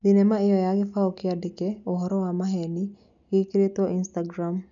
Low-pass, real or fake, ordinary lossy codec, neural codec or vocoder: 7.2 kHz; real; none; none